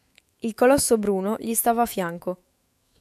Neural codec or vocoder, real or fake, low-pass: autoencoder, 48 kHz, 128 numbers a frame, DAC-VAE, trained on Japanese speech; fake; 14.4 kHz